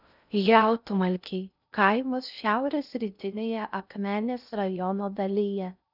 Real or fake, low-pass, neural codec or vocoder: fake; 5.4 kHz; codec, 16 kHz in and 24 kHz out, 0.6 kbps, FocalCodec, streaming, 2048 codes